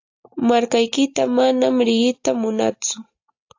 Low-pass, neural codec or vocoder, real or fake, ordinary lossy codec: 7.2 kHz; none; real; AAC, 48 kbps